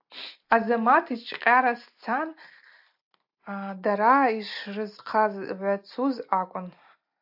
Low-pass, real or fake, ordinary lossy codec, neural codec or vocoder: 5.4 kHz; real; AAC, 48 kbps; none